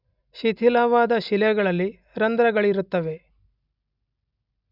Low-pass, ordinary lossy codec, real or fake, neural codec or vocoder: 5.4 kHz; none; real; none